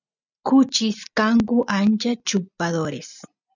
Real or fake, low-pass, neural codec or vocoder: real; 7.2 kHz; none